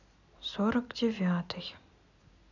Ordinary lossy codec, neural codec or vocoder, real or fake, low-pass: none; none; real; 7.2 kHz